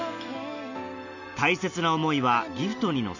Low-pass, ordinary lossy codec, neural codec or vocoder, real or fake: 7.2 kHz; none; none; real